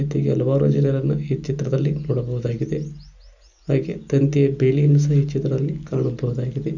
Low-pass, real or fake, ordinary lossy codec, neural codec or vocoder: 7.2 kHz; real; none; none